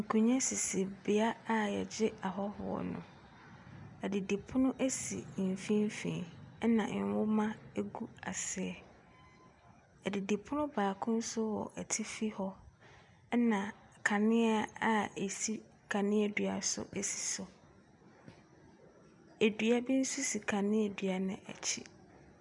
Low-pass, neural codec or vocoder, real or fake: 10.8 kHz; none; real